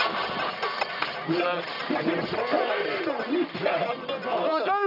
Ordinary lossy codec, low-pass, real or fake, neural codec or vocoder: none; 5.4 kHz; fake; codec, 44.1 kHz, 1.7 kbps, Pupu-Codec